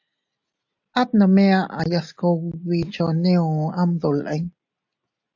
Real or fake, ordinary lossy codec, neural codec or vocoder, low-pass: real; AAC, 48 kbps; none; 7.2 kHz